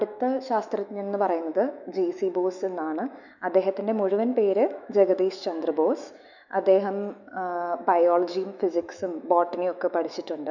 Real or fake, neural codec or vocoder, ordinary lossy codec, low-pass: fake; autoencoder, 48 kHz, 128 numbers a frame, DAC-VAE, trained on Japanese speech; none; 7.2 kHz